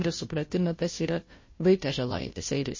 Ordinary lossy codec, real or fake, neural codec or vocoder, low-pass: MP3, 32 kbps; fake; codec, 16 kHz, 0.5 kbps, FunCodec, trained on Chinese and English, 25 frames a second; 7.2 kHz